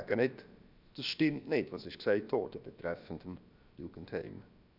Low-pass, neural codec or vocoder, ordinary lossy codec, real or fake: 5.4 kHz; codec, 16 kHz, about 1 kbps, DyCAST, with the encoder's durations; none; fake